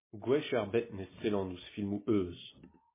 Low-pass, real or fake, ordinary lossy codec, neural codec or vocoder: 3.6 kHz; real; MP3, 16 kbps; none